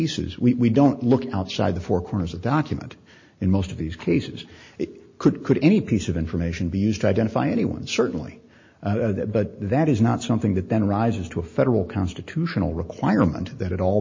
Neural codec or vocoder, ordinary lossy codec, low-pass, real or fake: none; MP3, 32 kbps; 7.2 kHz; real